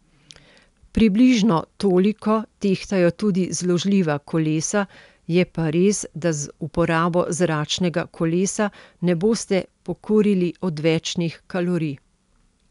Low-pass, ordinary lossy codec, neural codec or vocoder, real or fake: 10.8 kHz; none; none; real